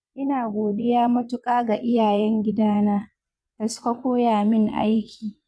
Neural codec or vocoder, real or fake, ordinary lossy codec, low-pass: vocoder, 22.05 kHz, 80 mel bands, WaveNeXt; fake; none; none